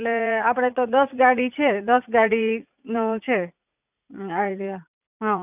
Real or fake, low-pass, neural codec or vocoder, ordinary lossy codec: fake; 3.6 kHz; vocoder, 44.1 kHz, 80 mel bands, Vocos; none